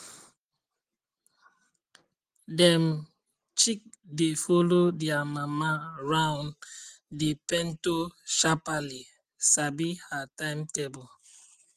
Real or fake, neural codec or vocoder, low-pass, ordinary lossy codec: real; none; 14.4 kHz; Opus, 24 kbps